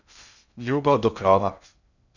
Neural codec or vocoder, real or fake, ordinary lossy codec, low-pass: codec, 16 kHz in and 24 kHz out, 0.6 kbps, FocalCodec, streaming, 2048 codes; fake; none; 7.2 kHz